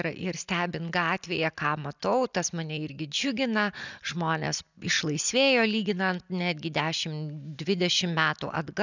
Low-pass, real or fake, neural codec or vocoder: 7.2 kHz; real; none